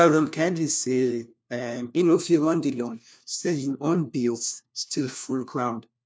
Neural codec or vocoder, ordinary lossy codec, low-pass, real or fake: codec, 16 kHz, 1 kbps, FunCodec, trained on LibriTTS, 50 frames a second; none; none; fake